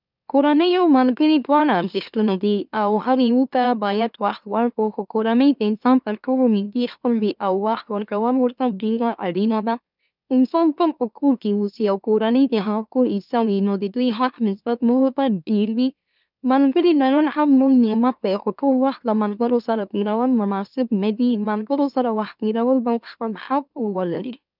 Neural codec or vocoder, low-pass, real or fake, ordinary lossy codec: autoencoder, 44.1 kHz, a latent of 192 numbers a frame, MeloTTS; 5.4 kHz; fake; none